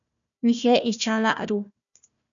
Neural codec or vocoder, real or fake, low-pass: codec, 16 kHz, 1 kbps, FunCodec, trained on Chinese and English, 50 frames a second; fake; 7.2 kHz